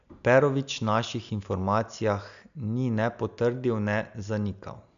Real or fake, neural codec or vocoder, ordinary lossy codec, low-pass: real; none; AAC, 96 kbps; 7.2 kHz